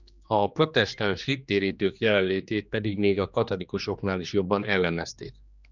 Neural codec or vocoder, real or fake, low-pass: codec, 16 kHz, 2 kbps, X-Codec, HuBERT features, trained on general audio; fake; 7.2 kHz